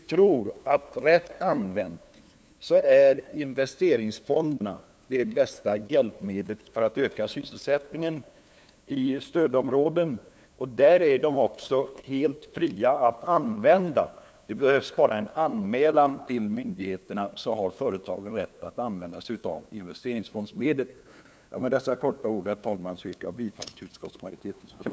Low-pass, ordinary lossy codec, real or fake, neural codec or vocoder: none; none; fake; codec, 16 kHz, 2 kbps, FunCodec, trained on LibriTTS, 25 frames a second